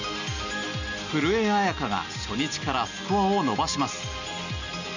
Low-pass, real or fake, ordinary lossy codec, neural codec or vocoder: 7.2 kHz; real; none; none